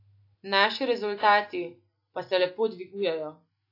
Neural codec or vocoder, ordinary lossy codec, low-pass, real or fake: none; AAC, 32 kbps; 5.4 kHz; real